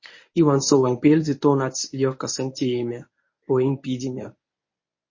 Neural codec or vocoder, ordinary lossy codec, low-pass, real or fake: codec, 24 kHz, 0.9 kbps, WavTokenizer, medium speech release version 1; MP3, 32 kbps; 7.2 kHz; fake